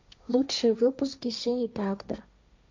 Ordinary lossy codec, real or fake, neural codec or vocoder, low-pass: MP3, 64 kbps; fake; codec, 16 kHz, 1.1 kbps, Voila-Tokenizer; 7.2 kHz